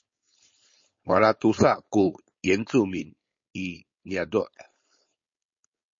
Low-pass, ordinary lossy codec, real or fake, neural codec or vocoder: 7.2 kHz; MP3, 32 kbps; fake; codec, 16 kHz, 4.8 kbps, FACodec